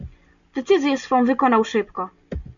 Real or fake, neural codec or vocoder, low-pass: real; none; 7.2 kHz